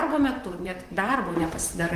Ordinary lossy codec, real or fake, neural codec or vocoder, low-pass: Opus, 32 kbps; fake; vocoder, 44.1 kHz, 128 mel bands, Pupu-Vocoder; 14.4 kHz